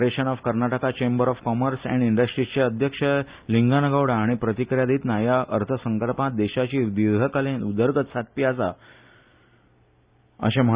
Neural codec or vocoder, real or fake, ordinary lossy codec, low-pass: none; real; Opus, 64 kbps; 3.6 kHz